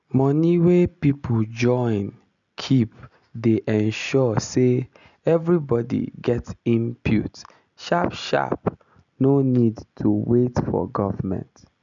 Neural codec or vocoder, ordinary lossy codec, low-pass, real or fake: none; none; 7.2 kHz; real